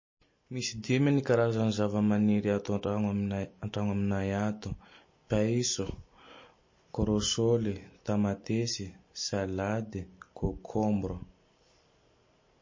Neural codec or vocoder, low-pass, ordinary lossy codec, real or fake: none; 7.2 kHz; MP3, 32 kbps; real